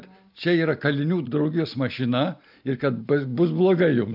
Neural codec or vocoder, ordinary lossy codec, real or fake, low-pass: none; AAC, 48 kbps; real; 5.4 kHz